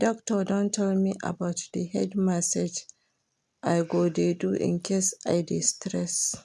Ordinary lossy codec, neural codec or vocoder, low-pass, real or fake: none; none; none; real